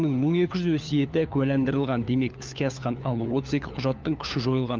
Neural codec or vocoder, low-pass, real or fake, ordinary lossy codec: codec, 16 kHz, 4 kbps, FunCodec, trained on LibriTTS, 50 frames a second; 7.2 kHz; fake; Opus, 24 kbps